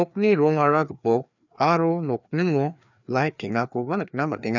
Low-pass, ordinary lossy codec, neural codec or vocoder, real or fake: 7.2 kHz; none; codec, 16 kHz, 2 kbps, FreqCodec, larger model; fake